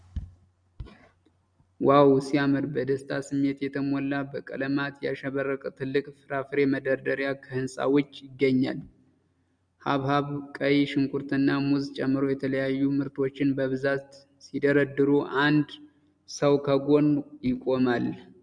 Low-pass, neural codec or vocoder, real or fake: 9.9 kHz; none; real